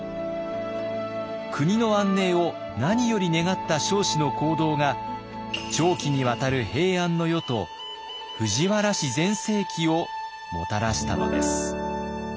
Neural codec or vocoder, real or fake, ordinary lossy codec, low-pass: none; real; none; none